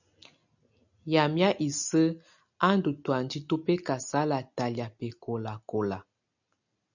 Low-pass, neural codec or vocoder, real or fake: 7.2 kHz; none; real